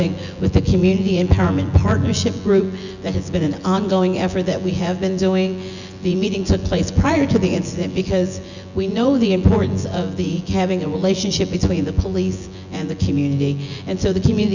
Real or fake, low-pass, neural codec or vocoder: fake; 7.2 kHz; vocoder, 24 kHz, 100 mel bands, Vocos